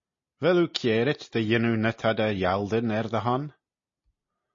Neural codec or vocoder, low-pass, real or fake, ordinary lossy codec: none; 7.2 kHz; real; MP3, 32 kbps